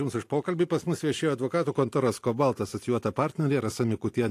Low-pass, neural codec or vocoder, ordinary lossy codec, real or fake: 14.4 kHz; none; AAC, 64 kbps; real